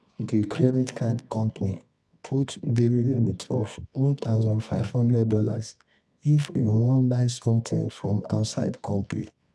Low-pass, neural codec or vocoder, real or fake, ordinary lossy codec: none; codec, 24 kHz, 0.9 kbps, WavTokenizer, medium music audio release; fake; none